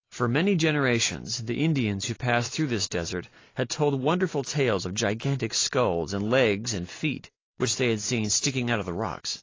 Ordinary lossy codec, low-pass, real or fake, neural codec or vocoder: AAC, 32 kbps; 7.2 kHz; real; none